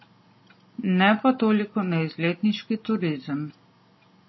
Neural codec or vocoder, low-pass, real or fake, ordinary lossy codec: none; 7.2 kHz; real; MP3, 24 kbps